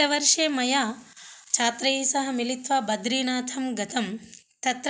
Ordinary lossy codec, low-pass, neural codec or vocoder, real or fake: none; none; none; real